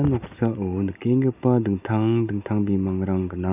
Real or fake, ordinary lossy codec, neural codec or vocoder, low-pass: real; none; none; 3.6 kHz